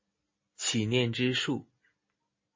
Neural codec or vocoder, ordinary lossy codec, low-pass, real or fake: none; MP3, 32 kbps; 7.2 kHz; real